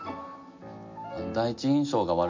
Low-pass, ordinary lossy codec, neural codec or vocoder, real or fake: 7.2 kHz; MP3, 48 kbps; none; real